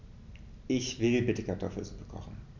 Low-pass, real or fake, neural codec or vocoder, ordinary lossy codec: 7.2 kHz; real; none; none